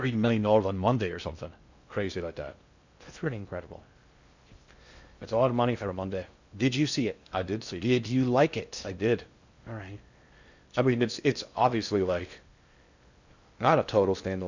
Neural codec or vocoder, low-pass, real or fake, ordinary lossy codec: codec, 16 kHz in and 24 kHz out, 0.6 kbps, FocalCodec, streaming, 2048 codes; 7.2 kHz; fake; Opus, 64 kbps